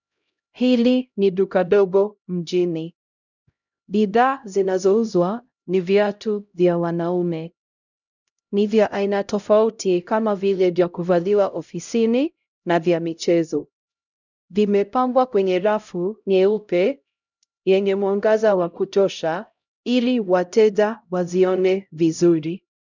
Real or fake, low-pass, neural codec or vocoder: fake; 7.2 kHz; codec, 16 kHz, 0.5 kbps, X-Codec, HuBERT features, trained on LibriSpeech